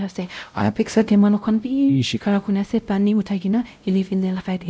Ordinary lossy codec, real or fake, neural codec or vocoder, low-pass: none; fake; codec, 16 kHz, 0.5 kbps, X-Codec, WavLM features, trained on Multilingual LibriSpeech; none